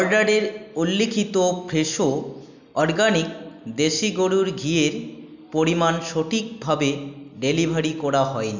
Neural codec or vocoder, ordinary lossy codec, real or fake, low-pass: none; none; real; 7.2 kHz